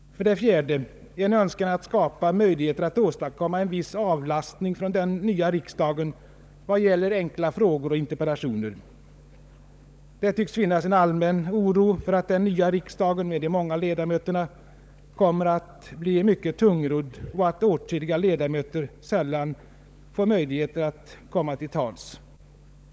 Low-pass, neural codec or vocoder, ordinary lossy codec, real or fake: none; codec, 16 kHz, 16 kbps, FunCodec, trained on LibriTTS, 50 frames a second; none; fake